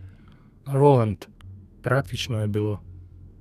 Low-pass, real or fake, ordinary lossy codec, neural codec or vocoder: 14.4 kHz; fake; none; codec, 32 kHz, 1.9 kbps, SNAC